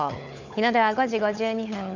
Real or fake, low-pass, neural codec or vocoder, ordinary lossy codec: fake; 7.2 kHz; codec, 16 kHz, 16 kbps, FunCodec, trained on LibriTTS, 50 frames a second; none